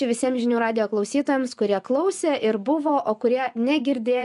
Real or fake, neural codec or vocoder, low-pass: fake; vocoder, 24 kHz, 100 mel bands, Vocos; 10.8 kHz